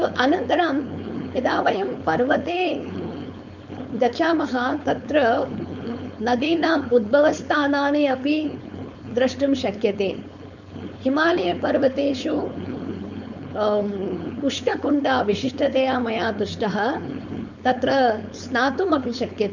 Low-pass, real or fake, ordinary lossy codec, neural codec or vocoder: 7.2 kHz; fake; none; codec, 16 kHz, 4.8 kbps, FACodec